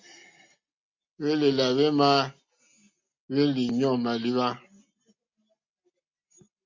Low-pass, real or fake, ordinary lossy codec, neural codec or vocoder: 7.2 kHz; real; MP3, 48 kbps; none